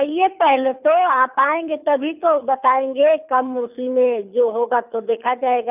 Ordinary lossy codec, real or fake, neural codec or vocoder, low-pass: none; fake; codec, 24 kHz, 6 kbps, HILCodec; 3.6 kHz